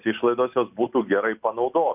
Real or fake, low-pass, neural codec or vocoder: real; 3.6 kHz; none